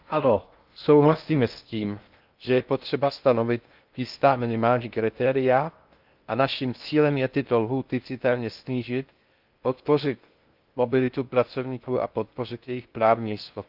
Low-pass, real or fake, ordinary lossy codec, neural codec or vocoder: 5.4 kHz; fake; Opus, 24 kbps; codec, 16 kHz in and 24 kHz out, 0.6 kbps, FocalCodec, streaming, 2048 codes